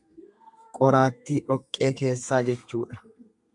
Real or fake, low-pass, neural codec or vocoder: fake; 10.8 kHz; codec, 32 kHz, 1.9 kbps, SNAC